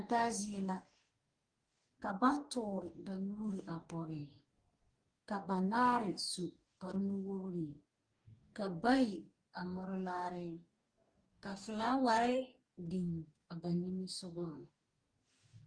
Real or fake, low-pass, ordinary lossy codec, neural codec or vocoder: fake; 9.9 kHz; Opus, 24 kbps; codec, 44.1 kHz, 2.6 kbps, DAC